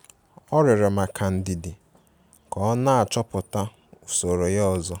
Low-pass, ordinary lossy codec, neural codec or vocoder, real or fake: none; none; none; real